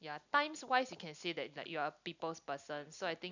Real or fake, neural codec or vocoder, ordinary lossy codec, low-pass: real; none; none; 7.2 kHz